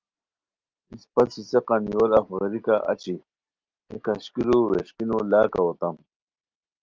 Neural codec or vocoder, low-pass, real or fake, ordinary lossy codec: none; 7.2 kHz; real; Opus, 32 kbps